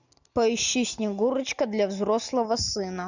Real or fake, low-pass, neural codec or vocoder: real; 7.2 kHz; none